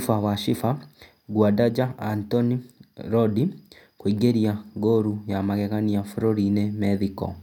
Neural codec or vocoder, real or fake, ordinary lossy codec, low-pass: none; real; none; 19.8 kHz